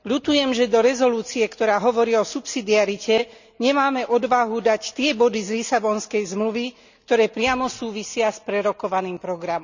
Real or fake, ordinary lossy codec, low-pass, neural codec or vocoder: real; none; 7.2 kHz; none